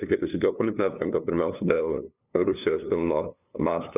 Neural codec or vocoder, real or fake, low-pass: codec, 16 kHz, 2 kbps, FunCodec, trained on LibriTTS, 25 frames a second; fake; 3.6 kHz